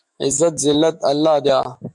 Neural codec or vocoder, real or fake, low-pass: autoencoder, 48 kHz, 128 numbers a frame, DAC-VAE, trained on Japanese speech; fake; 10.8 kHz